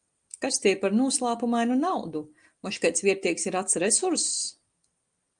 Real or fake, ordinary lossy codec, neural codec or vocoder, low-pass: real; Opus, 32 kbps; none; 9.9 kHz